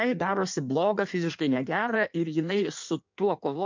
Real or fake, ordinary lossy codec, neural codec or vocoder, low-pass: fake; MP3, 64 kbps; codec, 16 kHz in and 24 kHz out, 1.1 kbps, FireRedTTS-2 codec; 7.2 kHz